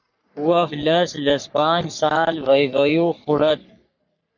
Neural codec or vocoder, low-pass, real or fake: codec, 44.1 kHz, 3.4 kbps, Pupu-Codec; 7.2 kHz; fake